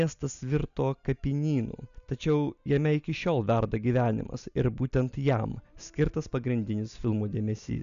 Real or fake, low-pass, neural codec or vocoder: real; 7.2 kHz; none